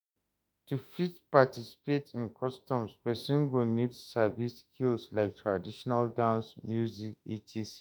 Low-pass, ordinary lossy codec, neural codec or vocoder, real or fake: none; none; autoencoder, 48 kHz, 32 numbers a frame, DAC-VAE, trained on Japanese speech; fake